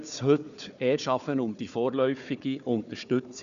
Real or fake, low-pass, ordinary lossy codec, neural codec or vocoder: fake; 7.2 kHz; none; codec, 16 kHz, 4 kbps, FunCodec, trained on Chinese and English, 50 frames a second